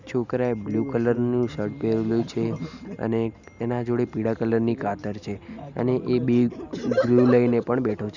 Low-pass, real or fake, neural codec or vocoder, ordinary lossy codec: 7.2 kHz; real; none; none